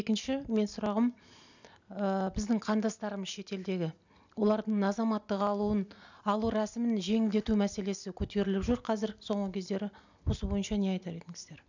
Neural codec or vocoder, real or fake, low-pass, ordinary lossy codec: none; real; 7.2 kHz; none